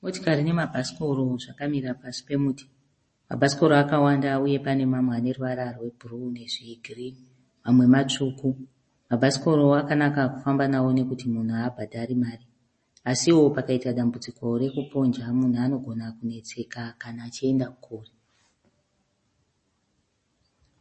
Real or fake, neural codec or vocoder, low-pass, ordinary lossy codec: real; none; 10.8 kHz; MP3, 32 kbps